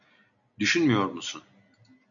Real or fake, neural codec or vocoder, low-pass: real; none; 7.2 kHz